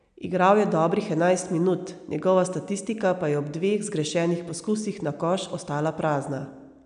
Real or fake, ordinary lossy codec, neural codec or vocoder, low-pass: real; none; none; 9.9 kHz